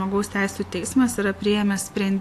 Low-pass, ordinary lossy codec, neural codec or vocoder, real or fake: 14.4 kHz; AAC, 64 kbps; none; real